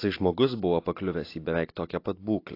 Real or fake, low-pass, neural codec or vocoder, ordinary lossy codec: real; 5.4 kHz; none; AAC, 32 kbps